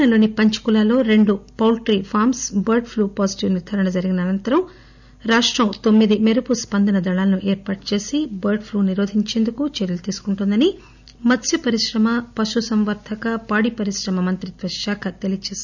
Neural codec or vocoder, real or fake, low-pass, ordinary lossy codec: none; real; 7.2 kHz; none